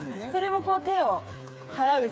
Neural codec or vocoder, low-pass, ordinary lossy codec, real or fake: codec, 16 kHz, 4 kbps, FreqCodec, smaller model; none; none; fake